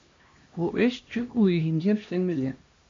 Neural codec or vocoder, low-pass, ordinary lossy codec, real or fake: codec, 16 kHz, 1 kbps, X-Codec, HuBERT features, trained on LibriSpeech; 7.2 kHz; MP3, 48 kbps; fake